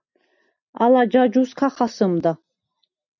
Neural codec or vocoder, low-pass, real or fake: none; 7.2 kHz; real